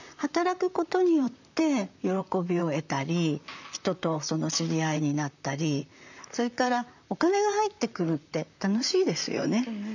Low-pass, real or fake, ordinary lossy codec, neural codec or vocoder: 7.2 kHz; fake; none; vocoder, 44.1 kHz, 128 mel bands, Pupu-Vocoder